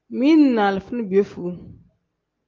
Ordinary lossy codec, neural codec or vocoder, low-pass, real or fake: Opus, 24 kbps; none; 7.2 kHz; real